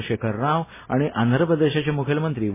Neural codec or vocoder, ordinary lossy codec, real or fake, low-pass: none; MP3, 16 kbps; real; 3.6 kHz